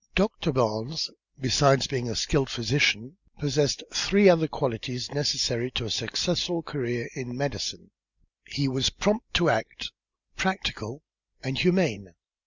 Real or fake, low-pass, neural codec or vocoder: real; 7.2 kHz; none